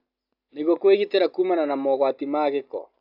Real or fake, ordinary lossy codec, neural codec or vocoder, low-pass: real; AAC, 48 kbps; none; 5.4 kHz